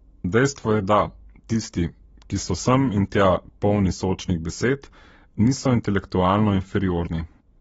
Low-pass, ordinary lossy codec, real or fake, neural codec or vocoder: 19.8 kHz; AAC, 24 kbps; fake; codec, 44.1 kHz, 7.8 kbps, Pupu-Codec